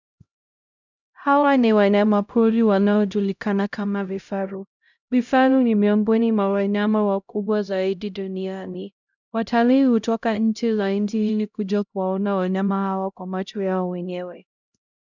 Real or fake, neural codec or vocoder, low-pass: fake; codec, 16 kHz, 0.5 kbps, X-Codec, HuBERT features, trained on LibriSpeech; 7.2 kHz